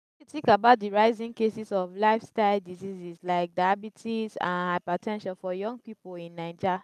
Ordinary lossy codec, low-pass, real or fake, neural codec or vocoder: none; 14.4 kHz; real; none